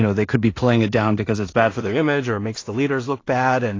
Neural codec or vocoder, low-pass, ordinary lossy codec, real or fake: codec, 16 kHz in and 24 kHz out, 0.4 kbps, LongCat-Audio-Codec, two codebook decoder; 7.2 kHz; AAC, 32 kbps; fake